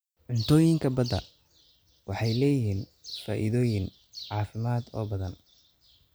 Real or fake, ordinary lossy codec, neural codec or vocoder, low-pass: real; none; none; none